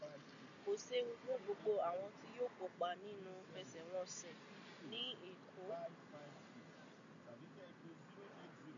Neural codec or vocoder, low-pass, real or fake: none; 7.2 kHz; real